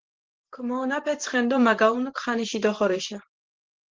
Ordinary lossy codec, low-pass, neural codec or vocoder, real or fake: Opus, 16 kbps; 7.2 kHz; vocoder, 44.1 kHz, 128 mel bands every 512 samples, BigVGAN v2; fake